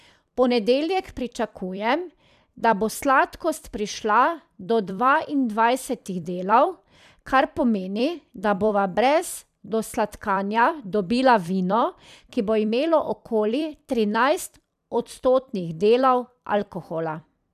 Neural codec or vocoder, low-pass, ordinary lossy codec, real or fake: vocoder, 44.1 kHz, 128 mel bands every 256 samples, BigVGAN v2; 14.4 kHz; none; fake